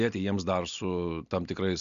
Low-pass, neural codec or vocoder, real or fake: 7.2 kHz; none; real